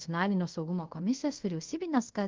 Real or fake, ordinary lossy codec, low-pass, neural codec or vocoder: fake; Opus, 16 kbps; 7.2 kHz; codec, 24 kHz, 0.5 kbps, DualCodec